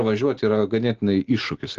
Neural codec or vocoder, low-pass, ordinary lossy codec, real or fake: none; 7.2 kHz; Opus, 16 kbps; real